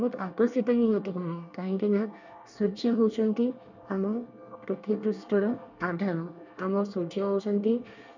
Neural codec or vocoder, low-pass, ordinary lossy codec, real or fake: codec, 24 kHz, 1 kbps, SNAC; 7.2 kHz; none; fake